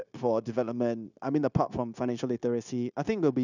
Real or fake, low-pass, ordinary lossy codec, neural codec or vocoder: fake; 7.2 kHz; none; codec, 16 kHz, 0.9 kbps, LongCat-Audio-Codec